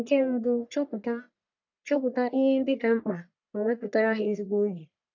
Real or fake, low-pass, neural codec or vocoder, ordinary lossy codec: fake; 7.2 kHz; codec, 44.1 kHz, 1.7 kbps, Pupu-Codec; none